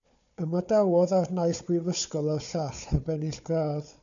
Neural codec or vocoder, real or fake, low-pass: codec, 16 kHz, 4 kbps, FunCodec, trained on Chinese and English, 50 frames a second; fake; 7.2 kHz